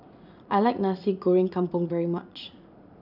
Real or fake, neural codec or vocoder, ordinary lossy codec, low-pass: fake; vocoder, 44.1 kHz, 80 mel bands, Vocos; none; 5.4 kHz